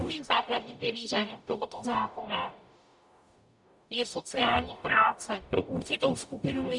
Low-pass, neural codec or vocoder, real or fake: 10.8 kHz; codec, 44.1 kHz, 0.9 kbps, DAC; fake